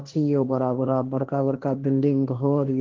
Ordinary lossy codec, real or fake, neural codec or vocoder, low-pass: Opus, 16 kbps; fake; codec, 16 kHz, 1.1 kbps, Voila-Tokenizer; 7.2 kHz